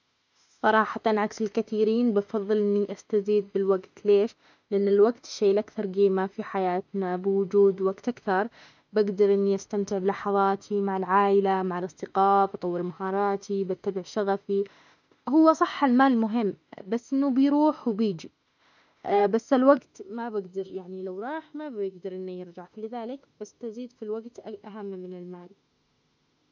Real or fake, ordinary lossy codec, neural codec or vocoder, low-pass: fake; none; autoencoder, 48 kHz, 32 numbers a frame, DAC-VAE, trained on Japanese speech; 7.2 kHz